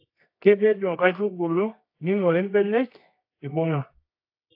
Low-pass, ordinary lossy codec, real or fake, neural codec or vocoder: 5.4 kHz; AAC, 32 kbps; fake; codec, 24 kHz, 0.9 kbps, WavTokenizer, medium music audio release